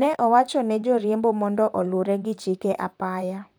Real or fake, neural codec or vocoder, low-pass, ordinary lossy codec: fake; vocoder, 44.1 kHz, 128 mel bands, Pupu-Vocoder; none; none